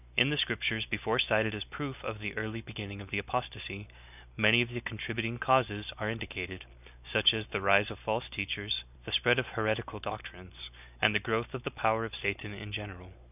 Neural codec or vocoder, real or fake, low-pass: none; real; 3.6 kHz